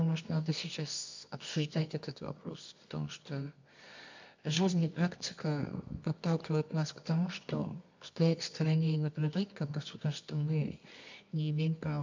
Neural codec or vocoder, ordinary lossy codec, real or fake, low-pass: codec, 24 kHz, 0.9 kbps, WavTokenizer, medium music audio release; MP3, 64 kbps; fake; 7.2 kHz